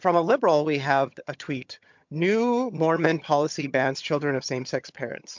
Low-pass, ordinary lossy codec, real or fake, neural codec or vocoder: 7.2 kHz; MP3, 64 kbps; fake; vocoder, 22.05 kHz, 80 mel bands, HiFi-GAN